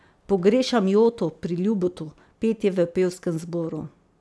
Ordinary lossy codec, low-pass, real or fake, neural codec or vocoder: none; none; real; none